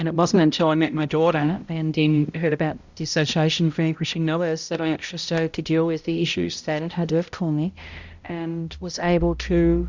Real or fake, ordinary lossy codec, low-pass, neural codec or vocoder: fake; Opus, 64 kbps; 7.2 kHz; codec, 16 kHz, 0.5 kbps, X-Codec, HuBERT features, trained on balanced general audio